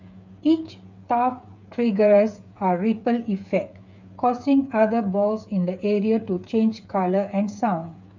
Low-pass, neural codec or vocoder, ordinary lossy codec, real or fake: 7.2 kHz; codec, 16 kHz, 8 kbps, FreqCodec, smaller model; none; fake